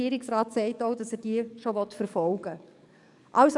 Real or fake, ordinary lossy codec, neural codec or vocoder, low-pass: fake; none; codec, 44.1 kHz, 7.8 kbps, DAC; 10.8 kHz